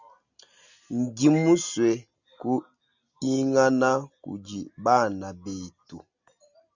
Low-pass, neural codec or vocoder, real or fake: 7.2 kHz; none; real